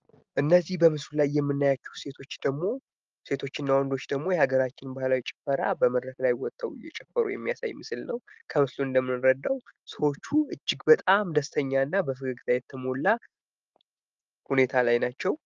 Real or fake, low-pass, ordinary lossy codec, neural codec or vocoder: real; 7.2 kHz; Opus, 24 kbps; none